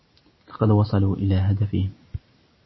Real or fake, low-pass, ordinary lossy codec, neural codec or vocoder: real; 7.2 kHz; MP3, 24 kbps; none